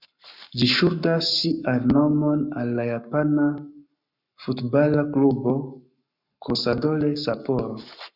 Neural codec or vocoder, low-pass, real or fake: codec, 44.1 kHz, 7.8 kbps, Pupu-Codec; 5.4 kHz; fake